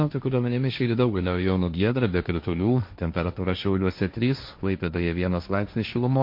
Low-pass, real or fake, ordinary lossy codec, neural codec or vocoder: 5.4 kHz; fake; MP3, 32 kbps; codec, 16 kHz, 1.1 kbps, Voila-Tokenizer